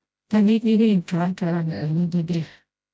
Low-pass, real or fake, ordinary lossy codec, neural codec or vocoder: none; fake; none; codec, 16 kHz, 0.5 kbps, FreqCodec, smaller model